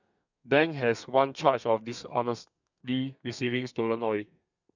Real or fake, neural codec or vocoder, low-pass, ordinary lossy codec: fake; codec, 32 kHz, 1.9 kbps, SNAC; 7.2 kHz; none